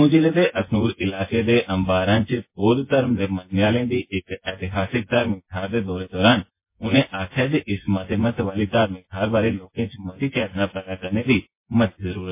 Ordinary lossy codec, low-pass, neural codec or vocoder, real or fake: MP3, 24 kbps; 3.6 kHz; vocoder, 24 kHz, 100 mel bands, Vocos; fake